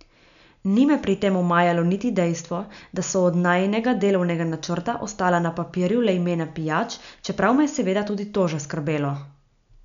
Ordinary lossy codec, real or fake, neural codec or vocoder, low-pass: none; real; none; 7.2 kHz